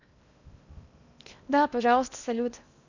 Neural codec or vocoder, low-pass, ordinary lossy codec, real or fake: codec, 16 kHz in and 24 kHz out, 0.8 kbps, FocalCodec, streaming, 65536 codes; 7.2 kHz; none; fake